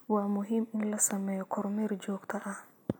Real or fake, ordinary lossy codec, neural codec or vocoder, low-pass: real; none; none; none